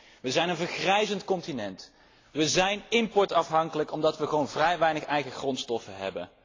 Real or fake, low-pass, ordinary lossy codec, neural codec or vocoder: real; 7.2 kHz; AAC, 32 kbps; none